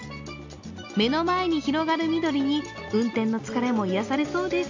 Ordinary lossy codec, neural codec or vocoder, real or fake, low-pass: AAC, 48 kbps; none; real; 7.2 kHz